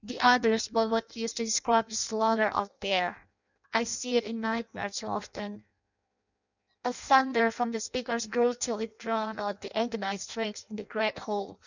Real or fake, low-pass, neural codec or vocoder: fake; 7.2 kHz; codec, 16 kHz in and 24 kHz out, 0.6 kbps, FireRedTTS-2 codec